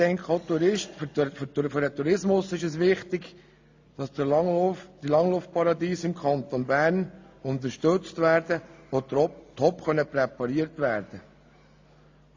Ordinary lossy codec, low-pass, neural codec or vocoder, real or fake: AAC, 48 kbps; 7.2 kHz; none; real